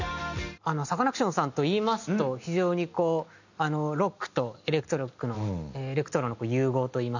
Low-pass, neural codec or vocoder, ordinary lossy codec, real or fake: 7.2 kHz; none; none; real